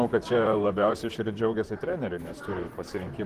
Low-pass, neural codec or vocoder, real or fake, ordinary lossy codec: 14.4 kHz; vocoder, 44.1 kHz, 128 mel bands, Pupu-Vocoder; fake; Opus, 16 kbps